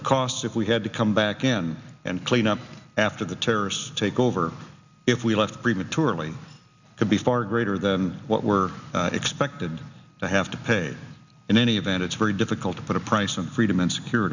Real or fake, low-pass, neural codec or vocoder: real; 7.2 kHz; none